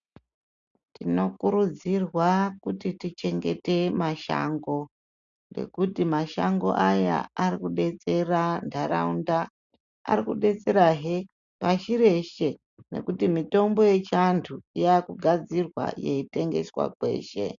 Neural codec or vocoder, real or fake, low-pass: none; real; 7.2 kHz